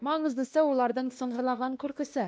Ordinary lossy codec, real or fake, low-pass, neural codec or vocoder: none; fake; none; codec, 16 kHz, 1 kbps, X-Codec, WavLM features, trained on Multilingual LibriSpeech